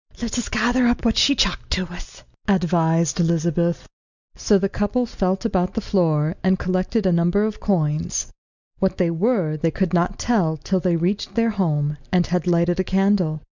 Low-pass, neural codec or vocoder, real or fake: 7.2 kHz; none; real